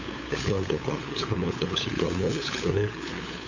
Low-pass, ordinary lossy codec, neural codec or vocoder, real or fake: 7.2 kHz; AAC, 48 kbps; codec, 16 kHz, 8 kbps, FunCodec, trained on LibriTTS, 25 frames a second; fake